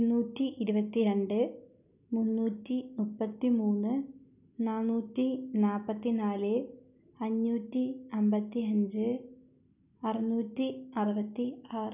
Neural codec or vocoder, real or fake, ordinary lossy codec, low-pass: none; real; none; 3.6 kHz